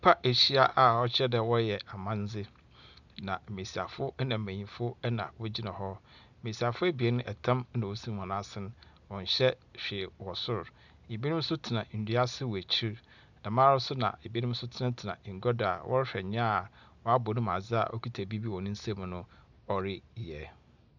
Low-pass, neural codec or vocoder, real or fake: 7.2 kHz; none; real